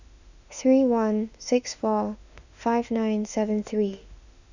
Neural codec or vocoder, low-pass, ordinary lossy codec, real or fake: autoencoder, 48 kHz, 32 numbers a frame, DAC-VAE, trained on Japanese speech; 7.2 kHz; none; fake